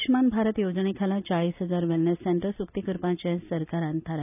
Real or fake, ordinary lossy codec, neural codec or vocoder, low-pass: real; none; none; 3.6 kHz